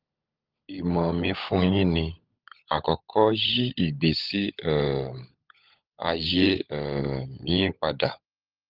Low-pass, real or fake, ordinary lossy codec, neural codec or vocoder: 5.4 kHz; fake; Opus, 24 kbps; codec, 16 kHz, 16 kbps, FunCodec, trained on LibriTTS, 50 frames a second